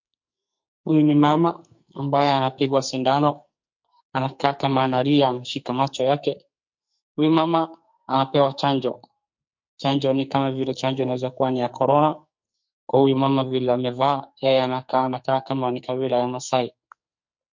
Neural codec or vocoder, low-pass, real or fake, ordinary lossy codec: codec, 44.1 kHz, 2.6 kbps, SNAC; 7.2 kHz; fake; MP3, 48 kbps